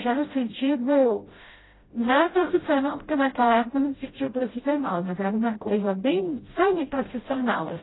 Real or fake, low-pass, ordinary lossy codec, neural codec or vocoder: fake; 7.2 kHz; AAC, 16 kbps; codec, 16 kHz, 0.5 kbps, FreqCodec, smaller model